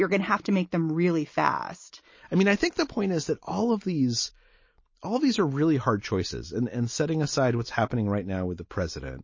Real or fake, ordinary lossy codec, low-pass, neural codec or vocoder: real; MP3, 32 kbps; 7.2 kHz; none